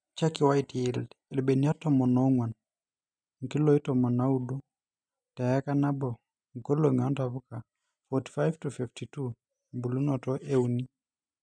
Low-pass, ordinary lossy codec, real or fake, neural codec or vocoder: 9.9 kHz; none; real; none